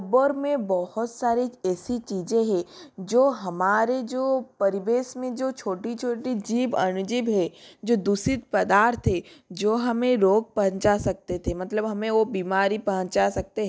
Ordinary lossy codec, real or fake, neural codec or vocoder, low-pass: none; real; none; none